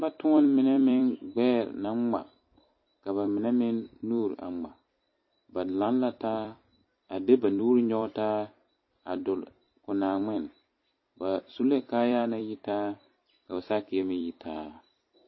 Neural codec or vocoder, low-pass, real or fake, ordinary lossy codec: vocoder, 44.1 kHz, 128 mel bands every 256 samples, BigVGAN v2; 7.2 kHz; fake; MP3, 24 kbps